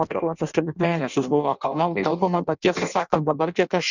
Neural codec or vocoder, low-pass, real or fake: codec, 16 kHz in and 24 kHz out, 0.6 kbps, FireRedTTS-2 codec; 7.2 kHz; fake